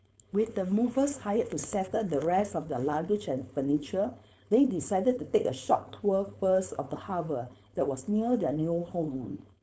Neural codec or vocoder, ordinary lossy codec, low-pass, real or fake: codec, 16 kHz, 4.8 kbps, FACodec; none; none; fake